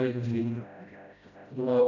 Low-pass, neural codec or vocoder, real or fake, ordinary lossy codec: 7.2 kHz; codec, 16 kHz, 0.5 kbps, FreqCodec, smaller model; fake; none